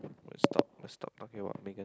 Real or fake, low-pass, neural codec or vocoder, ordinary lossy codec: real; none; none; none